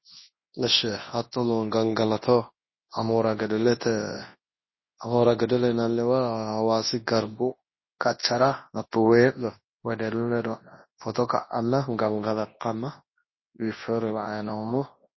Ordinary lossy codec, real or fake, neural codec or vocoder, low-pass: MP3, 24 kbps; fake; codec, 24 kHz, 0.9 kbps, WavTokenizer, large speech release; 7.2 kHz